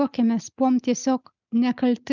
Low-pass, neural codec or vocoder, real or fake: 7.2 kHz; none; real